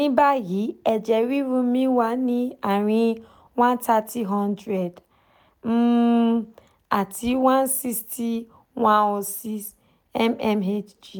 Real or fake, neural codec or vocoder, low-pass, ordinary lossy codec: real; none; none; none